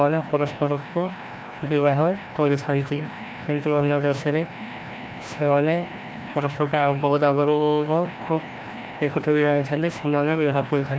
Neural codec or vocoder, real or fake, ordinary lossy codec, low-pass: codec, 16 kHz, 1 kbps, FreqCodec, larger model; fake; none; none